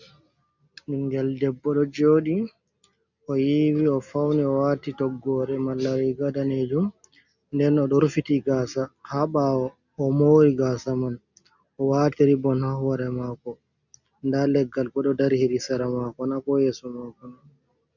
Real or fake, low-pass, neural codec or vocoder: real; 7.2 kHz; none